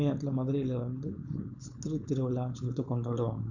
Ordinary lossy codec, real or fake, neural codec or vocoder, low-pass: none; fake; codec, 16 kHz, 4.8 kbps, FACodec; 7.2 kHz